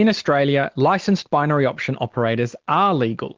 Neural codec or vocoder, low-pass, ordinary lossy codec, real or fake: none; 7.2 kHz; Opus, 16 kbps; real